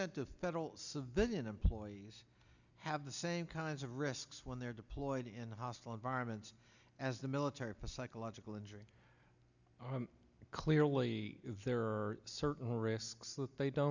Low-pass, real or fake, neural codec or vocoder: 7.2 kHz; real; none